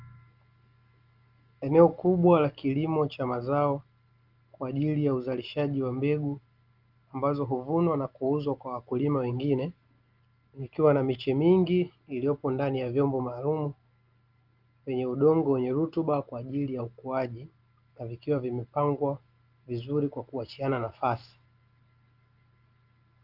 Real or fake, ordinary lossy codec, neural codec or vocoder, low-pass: real; Opus, 32 kbps; none; 5.4 kHz